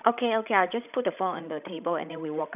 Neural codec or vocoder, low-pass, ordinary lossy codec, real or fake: codec, 16 kHz, 16 kbps, FreqCodec, larger model; 3.6 kHz; none; fake